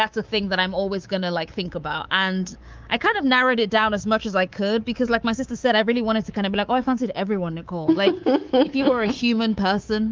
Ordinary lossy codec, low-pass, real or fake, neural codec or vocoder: Opus, 24 kbps; 7.2 kHz; fake; codec, 24 kHz, 3.1 kbps, DualCodec